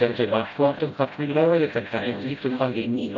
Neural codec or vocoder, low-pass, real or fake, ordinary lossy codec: codec, 16 kHz, 0.5 kbps, FreqCodec, smaller model; 7.2 kHz; fake; none